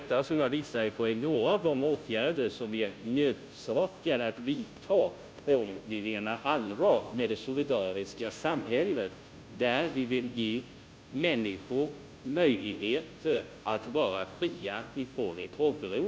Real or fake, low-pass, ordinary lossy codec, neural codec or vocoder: fake; none; none; codec, 16 kHz, 0.5 kbps, FunCodec, trained on Chinese and English, 25 frames a second